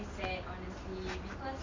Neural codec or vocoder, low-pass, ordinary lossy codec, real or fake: none; 7.2 kHz; AAC, 32 kbps; real